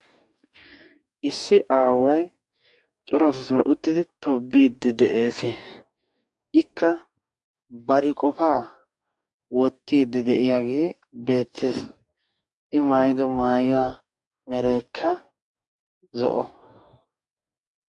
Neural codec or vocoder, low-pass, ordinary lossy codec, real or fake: codec, 44.1 kHz, 2.6 kbps, DAC; 10.8 kHz; MP3, 64 kbps; fake